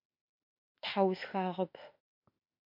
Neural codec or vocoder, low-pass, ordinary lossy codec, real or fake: autoencoder, 48 kHz, 32 numbers a frame, DAC-VAE, trained on Japanese speech; 5.4 kHz; MP3, 48 kbps; fake